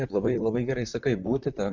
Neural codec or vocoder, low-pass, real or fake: vocoder, 44.1 kHz, 128 mel bands, Pupu-Vocoder; 7.2 kHz; fake